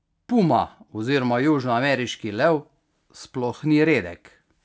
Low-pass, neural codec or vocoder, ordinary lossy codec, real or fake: none; none; none; real